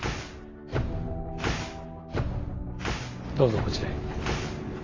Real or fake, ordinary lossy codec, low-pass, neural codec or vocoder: real; AAC, 32 kbps; 7.2 kHz; none